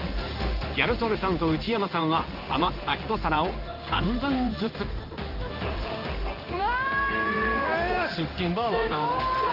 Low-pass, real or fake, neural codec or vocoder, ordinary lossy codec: 5.4 kHz; fake; codec, 16 kHz in and 24 kHz out, 1 kbps, XY-Tokenizer; Opus, 24 kbps